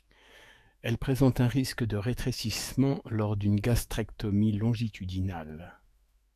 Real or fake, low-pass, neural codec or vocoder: fake; 14.4 kHz; autoencoder, 48 kHz, 128 numbers a frame, DAC-VAE, trained on Japanese speech